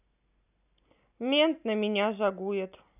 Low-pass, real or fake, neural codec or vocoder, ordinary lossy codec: 3.6 kHz; real; none; none